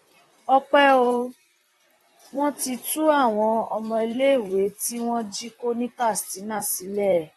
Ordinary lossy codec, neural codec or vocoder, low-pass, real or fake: AAC, 32 kbps; vocoder, 44.1 kHz, 128 mel bands, Pupu-Vocoder; 19.8 kHz; fake